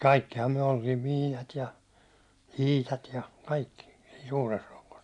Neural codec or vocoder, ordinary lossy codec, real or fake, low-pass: none; none; real; 10.8 kHz